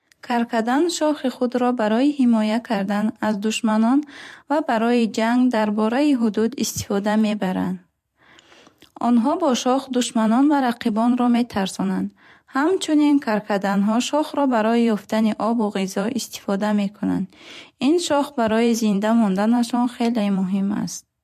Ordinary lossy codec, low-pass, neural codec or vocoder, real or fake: MP3, 64 kbps; 14.4 kHz; vocoder, 44.1 kHz, 128 mel bands every 512 samples, BigVGAN v2; fake